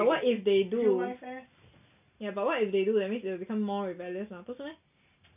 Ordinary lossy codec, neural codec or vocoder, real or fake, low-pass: none; none; real; 3.6 kHz